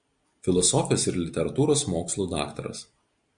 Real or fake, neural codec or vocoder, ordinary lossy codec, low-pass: real; none; Opus, 64 kbps; 9.9 kHz